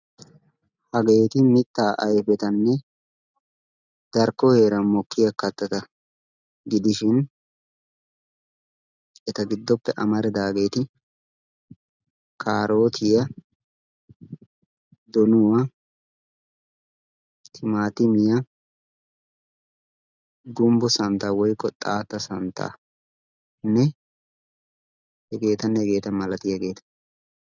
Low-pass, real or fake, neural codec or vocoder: 7.2 kHz; real; none